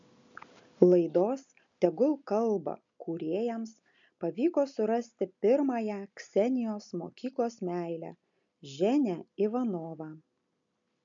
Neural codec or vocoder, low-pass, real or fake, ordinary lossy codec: none; 7.2 kHz; real; MP3, 64 kbps